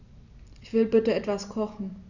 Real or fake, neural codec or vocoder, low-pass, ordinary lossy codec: real; none; 7.2 kHz; none